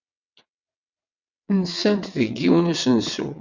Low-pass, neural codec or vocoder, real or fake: 7.2 kHz; vocoder, 22.05 kHz, 80 mel bands, WaveNeXt; fake